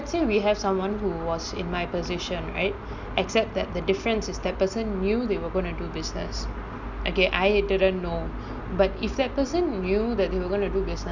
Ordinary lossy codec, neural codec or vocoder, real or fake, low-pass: none; none; real; 7.2 kHz